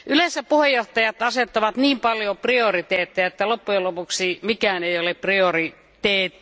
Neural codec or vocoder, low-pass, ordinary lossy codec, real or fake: none; none; none; real